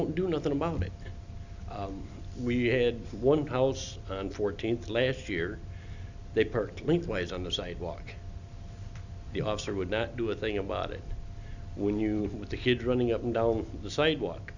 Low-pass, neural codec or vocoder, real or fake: 7.2 kHz; none; real